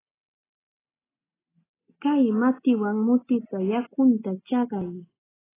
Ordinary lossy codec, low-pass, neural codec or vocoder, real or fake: AAC, 16 kbps; 3.6 kHz; none; real